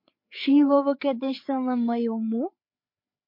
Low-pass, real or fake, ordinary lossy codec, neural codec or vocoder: 5.4 kHz; fake; MP3, 32 kbps; codec, 16 kHz, 4 kbps, FreqCodec, larger model